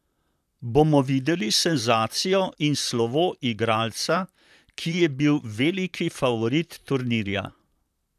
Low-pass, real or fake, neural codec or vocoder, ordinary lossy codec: 14.4 kHz; fake; vocoder, 44.1 kHz, 128 mel bands, Pupu-Vocoder; none